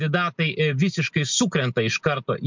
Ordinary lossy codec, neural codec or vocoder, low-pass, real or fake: MP3, 64 kbps; none; 7.2 kHz; real